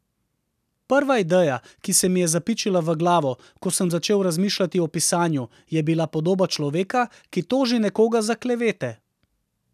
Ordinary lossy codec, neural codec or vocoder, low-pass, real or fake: none; none; 14.4 kHz; real